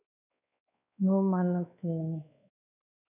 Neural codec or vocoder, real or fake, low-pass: codec, 16 kHz, 2 kbps, X-Codec, WavLM features, trained on Multilingual LibriSpeech; fake; 3.6 kHz